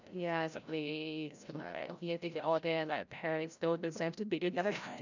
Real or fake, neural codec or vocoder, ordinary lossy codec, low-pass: fake; codec, 16 kHz, 0.5 kbps, FreqCodec, larger model; none; 7.2 kHz